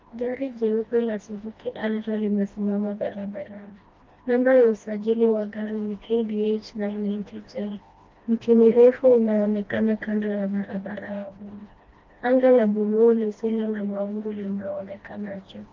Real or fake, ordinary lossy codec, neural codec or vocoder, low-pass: fake; Opus, 32 kbps; codec, 16 kHz, 1 kbps, FreqCodec, smaller model; 7.2 kHz